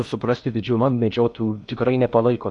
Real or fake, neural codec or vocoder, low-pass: fake; codec, 16 kHz in and 24 kHz out, 0.6 kbps, FocalCodec, streaming, 4096 codes; 10.8 kHz